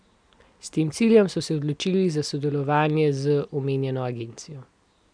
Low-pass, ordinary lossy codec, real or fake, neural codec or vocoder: 9.9 kHz; none; real; none